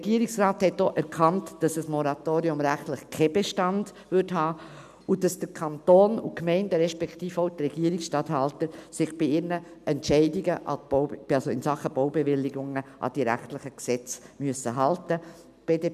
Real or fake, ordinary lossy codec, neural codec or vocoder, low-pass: real; none; none; 14.4 kHz